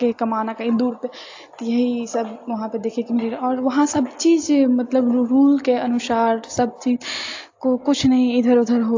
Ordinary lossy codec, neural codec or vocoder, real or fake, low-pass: AAC, 48 kbps; none; real; 7.2 kHz